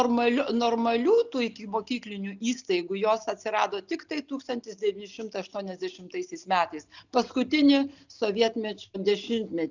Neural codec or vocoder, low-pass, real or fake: none; 7.2 kHz; real